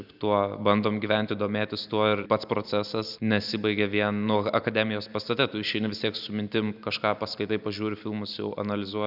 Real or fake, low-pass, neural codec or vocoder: real; 5.4 kHz; none